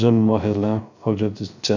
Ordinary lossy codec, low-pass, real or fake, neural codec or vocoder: none; 7.2 kHz; fake; codec, 16 kHz, 0.3 kbps, FocalCodec